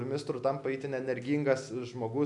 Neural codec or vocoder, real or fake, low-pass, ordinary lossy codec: none; real; 10.8 kHz; MP3, 96 kbps